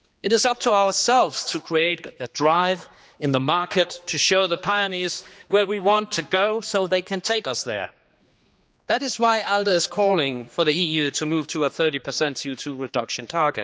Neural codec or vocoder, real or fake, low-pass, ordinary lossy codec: codec, 16 kHz, 2 kbps, X-Codec, HuBERT features, trained on general audio; fake; none; none